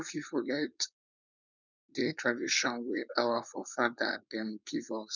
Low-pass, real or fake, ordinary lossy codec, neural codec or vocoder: 7.2 kHz; fake; none; codec, 16 kHz, 4.8 kbps, FACodec